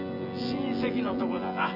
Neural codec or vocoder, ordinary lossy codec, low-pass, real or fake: vocoder, 24 kHz, 100 mel bands, Vocos; none; 5.4 kHz; fake